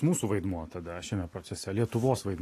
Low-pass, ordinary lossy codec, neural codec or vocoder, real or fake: 14.4 kHz; AAC, 48 kbps; none; real